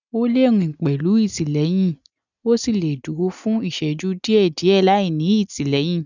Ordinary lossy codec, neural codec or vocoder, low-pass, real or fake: none; none; 7.2 kHz; real